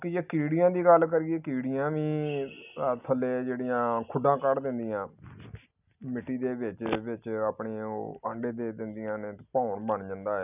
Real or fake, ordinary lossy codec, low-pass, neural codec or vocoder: real; none; 3.6 kHz; none